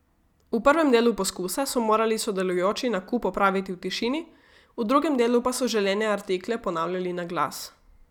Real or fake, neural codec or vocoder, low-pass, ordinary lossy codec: real; none; 19.8 kHz; none